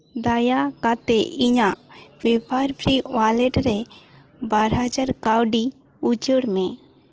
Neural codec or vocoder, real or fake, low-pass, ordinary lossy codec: none; real; 7.2 kHz; Opus, 16 kbps